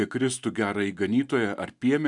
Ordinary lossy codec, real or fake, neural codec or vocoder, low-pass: MP3, 96 kbps; real; none; 10.8 kHz